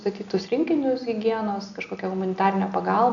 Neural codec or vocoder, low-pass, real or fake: none; 7.2 kHz; real